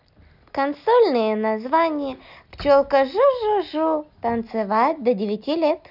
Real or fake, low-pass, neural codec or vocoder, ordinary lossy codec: real; 5.4 kHz; none; none